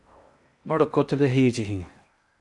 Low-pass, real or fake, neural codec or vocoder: 10.8 kHz; fake; codec, 16 kHz in and 24 kHz out, 0.6 kbps, FocalCodec, streaming, 4096 codes